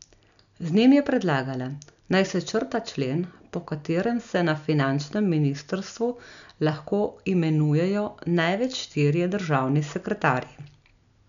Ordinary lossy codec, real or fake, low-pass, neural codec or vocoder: none; real; 7.2 kHz; none